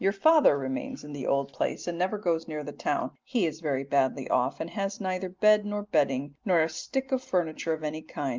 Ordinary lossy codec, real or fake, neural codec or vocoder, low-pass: Opus, 24 kbps; real; none; 7.2 kHz